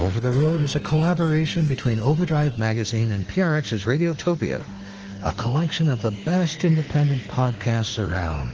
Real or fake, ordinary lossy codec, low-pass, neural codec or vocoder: fake; Opus, 16 kbps; 7.2 kHz; autoencoder, 48 kHz, 32 numbers a frame, DAC-VAE, trained on Japanese speech